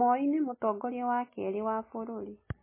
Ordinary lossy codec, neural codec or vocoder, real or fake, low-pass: MP3, 16 kbps; none; real; 3.6 kHz